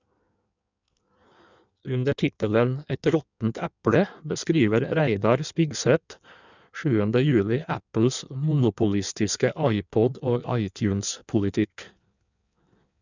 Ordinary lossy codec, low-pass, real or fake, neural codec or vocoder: none; 7.2 kHz; fake; codec, 16 kHz in and 24 kHz out, 1.1 kbps, FireRedTTS-2 codec